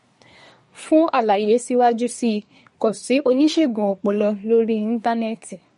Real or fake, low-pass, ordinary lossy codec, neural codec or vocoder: fake; 10.8 kHz; MP3, 48 kbps; codec, 24 kHz, 1 kbps, SNAC